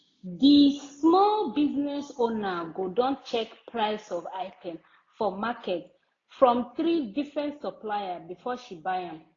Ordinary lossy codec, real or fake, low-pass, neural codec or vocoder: AAC, 32 kbps; real; 7.2 kHz; none